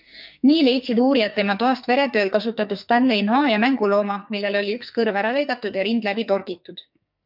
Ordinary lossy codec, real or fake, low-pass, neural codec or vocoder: MP3, 48 kbps; fake; 5.4 kHz; codec, 44.1 kHz, 2.6 kbps, SNAC